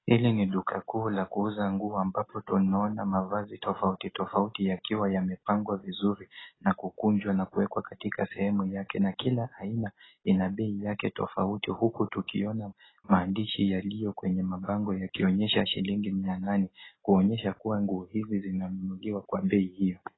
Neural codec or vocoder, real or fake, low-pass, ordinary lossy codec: none; real; 7.2 kHz; AAC, 16 kbps